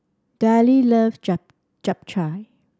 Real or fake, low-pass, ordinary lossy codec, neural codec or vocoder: real; none; none; none